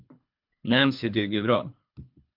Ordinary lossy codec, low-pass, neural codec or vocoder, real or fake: MP3, 48 kbps; 5.4 kHz; codec, 24 kHz, 3 kbps, HILCodec; fake